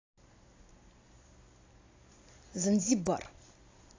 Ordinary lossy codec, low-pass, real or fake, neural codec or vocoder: AAC, 32 kbps; 7.2 kHz; real; none